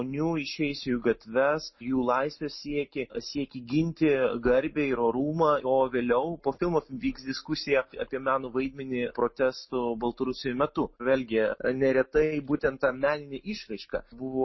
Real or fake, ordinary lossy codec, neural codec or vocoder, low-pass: real; MP3, 24 kbps; none; 7.2 kHz